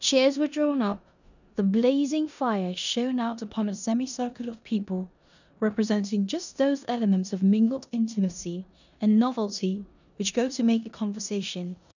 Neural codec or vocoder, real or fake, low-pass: codec, 16 kHz in and 24 kHz out, 0.9 kbps, LongCat-Audio-Codec, four codebook decoder; fake; 7.2 kHz